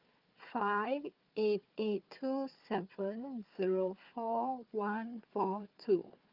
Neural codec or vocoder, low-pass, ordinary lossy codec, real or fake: codec, 16 kHz, 4 kbps, FunCodec, trained on Chinese and English, 50 frames a second; 5.4 kHz; Opus, 24 kbps; fake